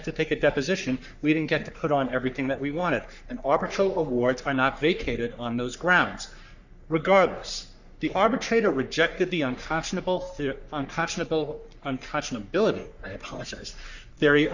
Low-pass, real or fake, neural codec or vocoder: 7.2 kHz; fake; codec, 44.1 kHz, 3.4 kbps, Pupu-Codec